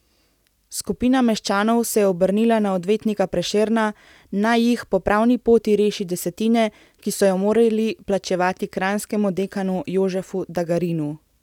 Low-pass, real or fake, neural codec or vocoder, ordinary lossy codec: 19.8 kHz; real; none; none